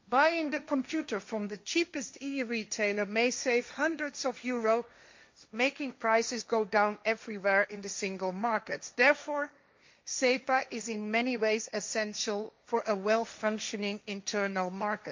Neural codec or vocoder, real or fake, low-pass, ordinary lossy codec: codec, 16 kHz, 1.1 kbps, Voila-Tokenizer; fake; 7.2 kHz; MP3, 48 kbps